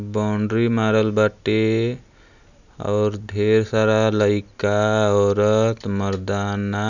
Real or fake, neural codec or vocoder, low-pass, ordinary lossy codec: real; none; 7.2 kHz; none